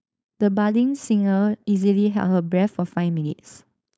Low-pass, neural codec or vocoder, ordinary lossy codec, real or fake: none; codec, 16 kHz, 4.8 kbps, FACodec; none; fake